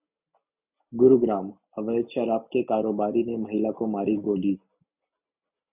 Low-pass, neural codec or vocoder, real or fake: 3.6 kHz; none; real